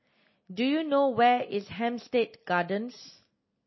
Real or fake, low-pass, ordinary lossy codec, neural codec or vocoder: real; 7.2 kHz; MP3, 24 kbps; none